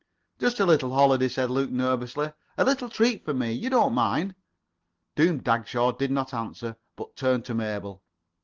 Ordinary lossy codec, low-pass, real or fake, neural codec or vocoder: Opus, 16 kbps; 7.2 kHz; real; none